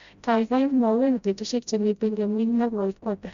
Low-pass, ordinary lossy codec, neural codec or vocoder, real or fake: 7.2 kHz; Opus, 64 kbps; codec, 16 kHz, 0.5 kbps, FreqCodec, smaller model; fake